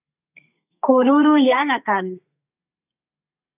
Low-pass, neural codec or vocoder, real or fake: 3.6 kHz; codec, 32 kHz, 1.9 kbps, SNAC; fake